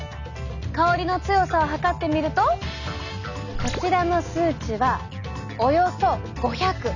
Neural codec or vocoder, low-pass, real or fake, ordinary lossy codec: none; 7.2 kHz; real; none